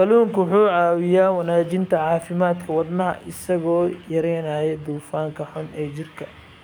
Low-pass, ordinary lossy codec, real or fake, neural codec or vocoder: none; none; fake; codec, 44.1 kHz, 7.8 kbps, DAC